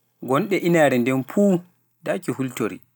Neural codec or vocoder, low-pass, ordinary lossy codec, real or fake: none; none; none; real